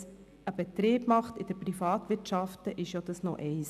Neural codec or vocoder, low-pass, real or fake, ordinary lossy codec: none; 14.4 kHz; real; none